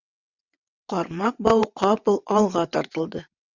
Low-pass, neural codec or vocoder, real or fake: 7.2 kHz; vocoder, 22.05 kHz, 80 mel bands, WaveNeXt; fake